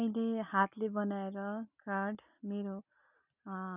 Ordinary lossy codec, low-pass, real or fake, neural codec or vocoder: none; 3.6 kHz; real; none